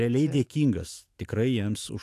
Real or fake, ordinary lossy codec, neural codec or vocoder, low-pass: fake; AAC, 64 kbps; autoencoder, 48 kHz, 128 numbers a frame, DAC-VAE, trained on Japanese speech; 14.4 kHz